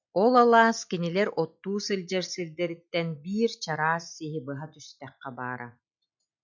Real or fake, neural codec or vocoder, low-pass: real; none; 7.2 kHz